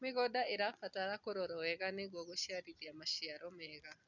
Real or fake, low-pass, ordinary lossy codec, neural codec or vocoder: real; 7.2 kHz; none; none